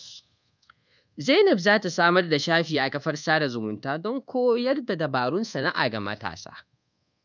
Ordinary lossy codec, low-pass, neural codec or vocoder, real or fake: none; 7.2 kHz; codec, 24 kHz, 1.2 kbps, DualCodec; fake